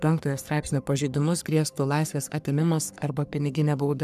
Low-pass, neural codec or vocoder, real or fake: 14.4 kHz; codec, 44.1 kHz, 3.4 kbps, Pupu-Codec; fake